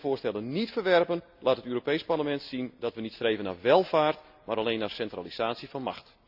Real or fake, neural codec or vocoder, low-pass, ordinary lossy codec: real; none; 5.4 kHz; AAC, 48 kbps